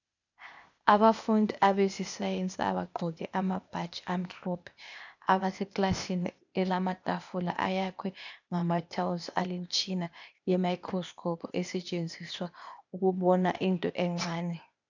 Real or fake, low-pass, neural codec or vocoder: fake; 7.2 kHz; codec, 16 kHz, 0.8 kbps, ZipCodec